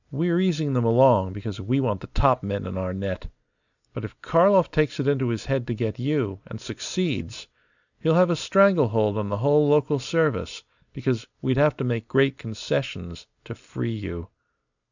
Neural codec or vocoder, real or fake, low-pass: none; real; 7.2 kHz